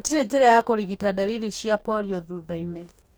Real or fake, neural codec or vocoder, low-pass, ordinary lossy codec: fake; codec, 44.1 kHz, 2.6 kbps, DAC; none; none